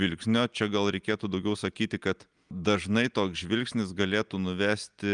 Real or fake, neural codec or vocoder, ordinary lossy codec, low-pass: real; none; Opus, 32 kbps; 9.9 kHz